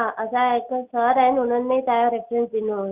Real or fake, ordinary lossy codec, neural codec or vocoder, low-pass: real; Opus, 64 kbps; none; 3.6 kHz